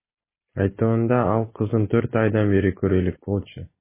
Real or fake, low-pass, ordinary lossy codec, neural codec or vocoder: real; 3.6 kHz; MP3, 16 kbps; none